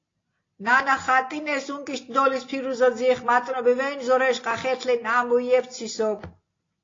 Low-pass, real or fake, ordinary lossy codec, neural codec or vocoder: 7.2 kHz; real; AAC, 32 kbps; none